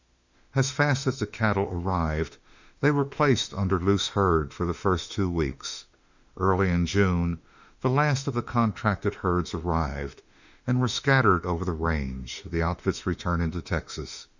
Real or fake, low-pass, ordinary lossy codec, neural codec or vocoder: fake; 7.2 kHz; Opus, 64 kbps; autoencoder, 48 kHz, 32 numbers a frame, DAC-VAE, trained on Japanese speech